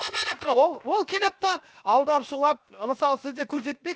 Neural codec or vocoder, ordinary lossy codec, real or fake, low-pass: codec, 16 kHz, 0.7 kbps, FocalCodec; none; fake; none